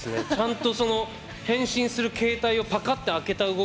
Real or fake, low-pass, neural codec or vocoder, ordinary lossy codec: real; none; none; none